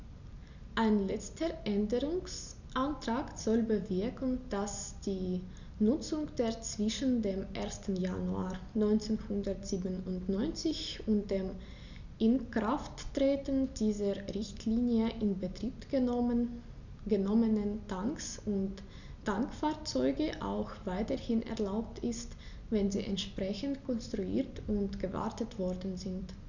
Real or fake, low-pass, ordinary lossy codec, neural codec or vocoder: real; 7.2 kHz; none; none